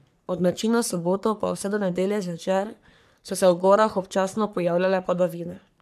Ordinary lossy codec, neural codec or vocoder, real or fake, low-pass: none; codec, 44.1 kHz, 3.4 kbps, Pupu-Codec; fake; 14.4 kHz